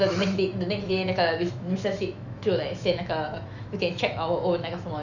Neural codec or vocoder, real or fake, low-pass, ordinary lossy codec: autoencoder, 48 kHz, 128 numbers a frame, DAC-VAE, trained on Japanese speech; fake; 7.2 kHz; none